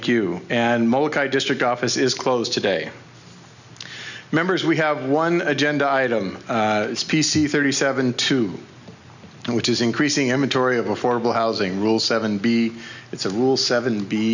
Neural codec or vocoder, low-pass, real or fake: none; 7.2 kHz; real